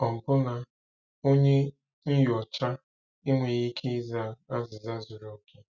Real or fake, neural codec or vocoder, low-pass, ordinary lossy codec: real; none; 7.2 kHz; Opus, 64 kbps